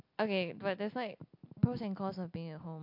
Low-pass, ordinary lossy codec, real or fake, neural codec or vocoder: 5.4 kHz; MP3, 48 kbps; real; none